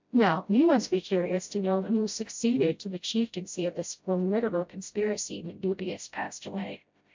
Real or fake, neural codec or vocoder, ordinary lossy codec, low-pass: fake; codec, 16 kHz, 0.5 kbps, FreqCodec, smaller model; MP3, 64 kbps; 7.2 kHz